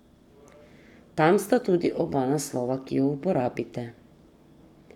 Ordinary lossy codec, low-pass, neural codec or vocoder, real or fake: none; 19.8 kHz; codec, 44.1 kHz, 7.8 kbps, Pupu-Codec; fake